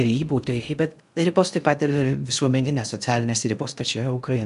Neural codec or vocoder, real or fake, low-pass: codec, 16 kHz in and 24 kHz out, 0.6 kbps, FocalCodec, streaming, 2048 codes; fake; 10.8 kHz